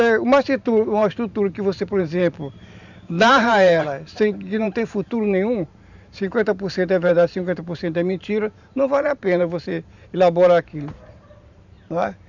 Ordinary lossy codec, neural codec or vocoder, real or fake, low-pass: none; vocoder, 44.1 kHz, 128 mel bands every 512 samples, BigVGAN v2; fake; 7.2 kHz